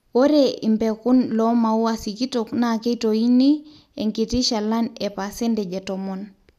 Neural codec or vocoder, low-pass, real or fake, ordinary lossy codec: none; 14.4 kHz; real; none